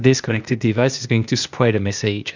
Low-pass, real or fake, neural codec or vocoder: 7.2 kHz; fake; codec, 16 kHz, 0.8 kbps, ZipCodec